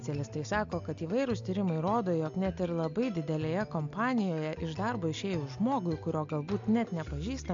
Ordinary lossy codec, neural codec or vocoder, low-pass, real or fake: AAC, 64 kbps; none; 7.2 kHz; real